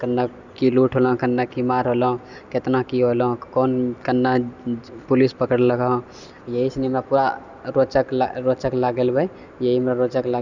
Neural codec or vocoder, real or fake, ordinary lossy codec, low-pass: none; real; none; 7.2 kHz